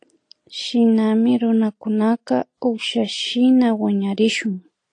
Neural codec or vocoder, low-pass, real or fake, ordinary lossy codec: none; 9.9 kHz; real; AAC, 48 kbps